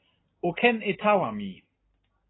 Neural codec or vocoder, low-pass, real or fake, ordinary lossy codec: none; 7.2 kHz; real; AAC, 16 kbps